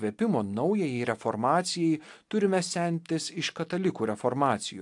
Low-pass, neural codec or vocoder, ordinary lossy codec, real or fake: 10.8 kHz; none; AAC, 64 kbps; real